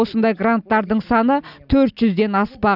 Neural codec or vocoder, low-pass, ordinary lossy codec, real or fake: none; 5.4 kHz; none; real